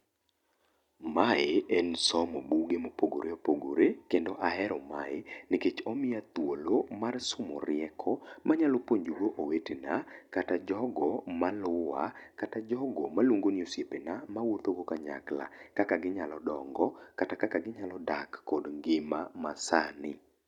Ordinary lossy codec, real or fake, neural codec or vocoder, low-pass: none; real; none; 19.8 kHz